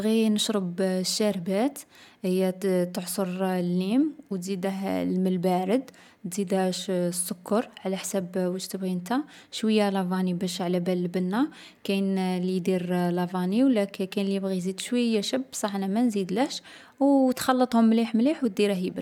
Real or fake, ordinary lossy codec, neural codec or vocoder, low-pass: real; none; none; 19.8 kHz